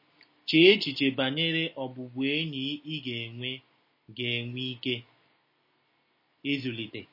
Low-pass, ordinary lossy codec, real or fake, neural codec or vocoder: 5.4 kHz; MP3, 24 kbps; real; none